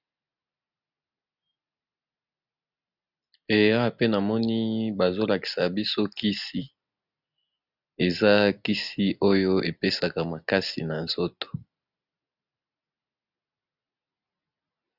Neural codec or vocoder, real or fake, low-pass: none; real; 5.4 kHz